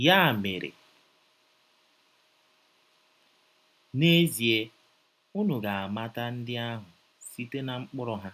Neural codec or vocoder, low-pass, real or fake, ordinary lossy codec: none; 14.4 kHz; real; none